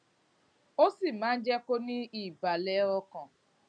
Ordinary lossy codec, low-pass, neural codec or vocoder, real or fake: none; 9.9 kHz; none; real